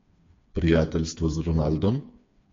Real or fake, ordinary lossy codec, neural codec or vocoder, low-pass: fake; MP3, 48 kbps; codec, 16 kHz, 4 kbps, FreqCodec, smaller model; 7.2 kHz